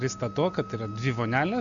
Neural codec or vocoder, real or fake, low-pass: none; real; 7.2 kHz